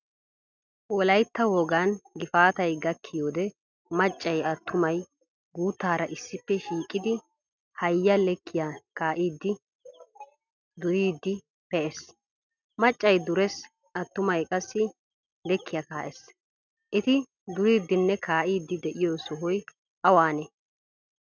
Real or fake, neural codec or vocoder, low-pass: real; none; 7.2 kHz